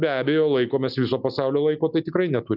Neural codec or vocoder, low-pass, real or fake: none; 5.4 kHz; real